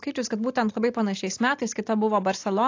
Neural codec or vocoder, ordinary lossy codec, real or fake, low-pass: none; AAC, 48 kbps; real; 7.2 kHz